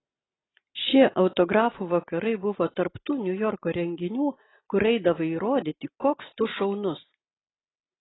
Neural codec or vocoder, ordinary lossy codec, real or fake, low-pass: none; AAC, 16 kbps; real; 7.2 kHz